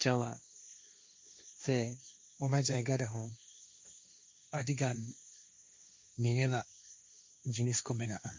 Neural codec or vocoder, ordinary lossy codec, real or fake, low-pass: codec, 16 kHz, 1.1 kbps, Voila-Tokenizer; none; fake; none